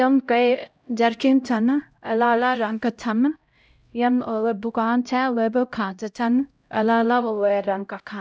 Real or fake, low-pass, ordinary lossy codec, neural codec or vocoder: fake; none; none; codec, 16 kHz, 0.5 kbps, X-Codec, HuBERT features, trained on LibriSpeech